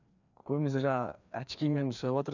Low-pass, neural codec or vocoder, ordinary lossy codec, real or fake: 7.2 kHz; codec, 16 kHz, 2 kbps, FreqCodec, larger model; none; fake